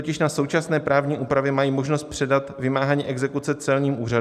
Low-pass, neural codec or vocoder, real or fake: 14.4 kHz; none; real